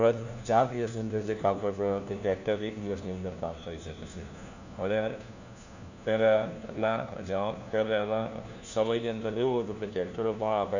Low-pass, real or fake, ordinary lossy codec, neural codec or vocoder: 7.2 kHz; fake; none; codec, 16 kHz, 1 kbps, FunCodec, trained on LibriTTS, 50 frames a second